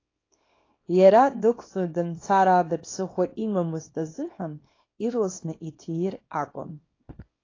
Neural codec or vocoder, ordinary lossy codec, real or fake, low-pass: codec, 24 kHz, 0.9 kbps, WavTokenizer, small release; AAC, 32 kbps; fake; 7.2 kHz